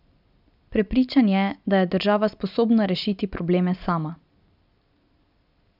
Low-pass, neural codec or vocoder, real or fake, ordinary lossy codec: 5.4 kHz; none; real; none